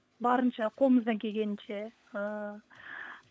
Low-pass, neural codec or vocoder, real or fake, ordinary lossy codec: none; codec, 16 kHz, 16 kbps, FunCodec, trained on LibriTTS, 50 frames a second; fake; none